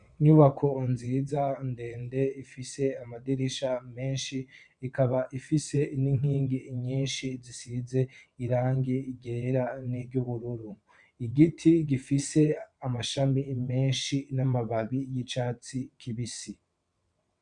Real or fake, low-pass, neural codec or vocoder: fake; 9.9 kHz; vocoder, 22.05 kHz, 80 mel bands, WaveNeXt